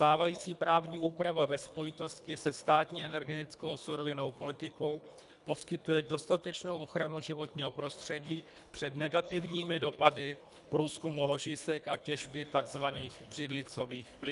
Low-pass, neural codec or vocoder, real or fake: 10.8 kHz; codec, 24 kHz, 1.5 kbps, HILCodec; fake